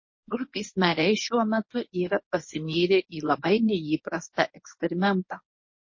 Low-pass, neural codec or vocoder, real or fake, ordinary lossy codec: 7.2 kHz; codec, 24 kHz, 0.9 kbps, WavTokenizer, medium speech release version 1; fake; MP3, 32 kbps